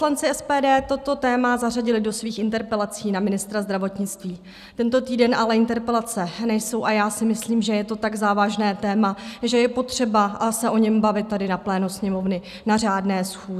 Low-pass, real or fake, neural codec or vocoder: 14.4 kHz; real; none